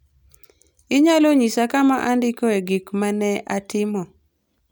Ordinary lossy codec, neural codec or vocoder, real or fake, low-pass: none; none; real; none